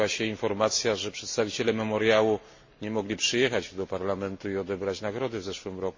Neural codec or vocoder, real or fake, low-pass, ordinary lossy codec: none; real; 7.2 kHz; MP3, 32 kbps